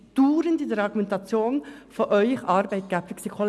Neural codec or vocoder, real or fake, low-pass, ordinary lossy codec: none; real; none; none